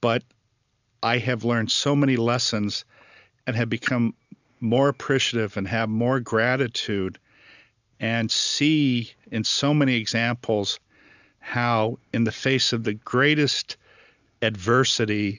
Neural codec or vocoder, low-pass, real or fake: none; 7.2 kHz; real